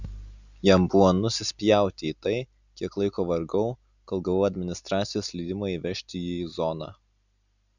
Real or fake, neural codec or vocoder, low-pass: real; none; 7.2 kHz